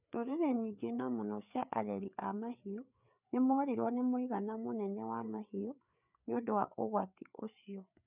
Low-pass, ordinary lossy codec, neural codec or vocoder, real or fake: 3.6 kHz; none; codec, 16 kHz, 4 kbps, FreqCodec, larger model; fake